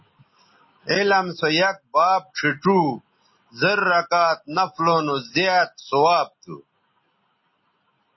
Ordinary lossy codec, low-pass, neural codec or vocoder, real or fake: MP3, 24 kbps; 7.2 kHz; none; real